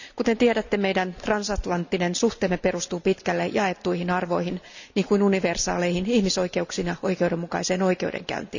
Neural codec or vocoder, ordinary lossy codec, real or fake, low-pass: none; none; real; 7.2 kHz